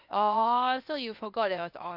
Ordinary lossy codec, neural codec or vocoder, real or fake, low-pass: none; codec, 16 kHz, 0.8 kbps, ZipCodec; fake; 5.4 kHz